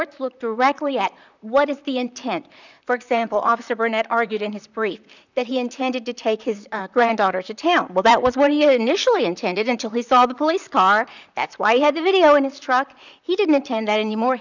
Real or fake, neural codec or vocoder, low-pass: fake; vocoder, 44.1 kHz, 128 mel bands, Pupu-Vocoder; 7.2 kHz